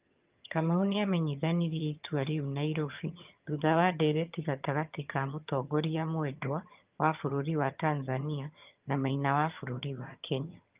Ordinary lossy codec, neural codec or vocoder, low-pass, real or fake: Opus, 24 kbps; vocoder, 22.05 kHz, 80 mel bands, HiFi-GAN; 3.6 kHz; fake